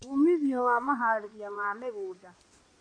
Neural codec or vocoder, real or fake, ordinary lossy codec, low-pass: codec, 16 kHz in and 24 kHz out, 2.2 kbps, FireRedTTS-2 codec; fake; none; 9.9 kHz